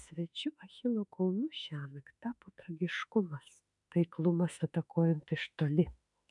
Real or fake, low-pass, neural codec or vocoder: fake; 10.8 kHz; autoencoder, 48 kHz, 32 numbers a frame, DAC-VAE, trained on Japanese speech